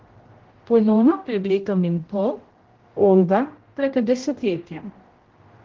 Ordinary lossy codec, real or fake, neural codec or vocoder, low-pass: Opus, 16 kbps; fake; codec, 16 kHz, 0.5 kbps, X-Codec, HuBERT features, trained on general audio; 7.2 kHz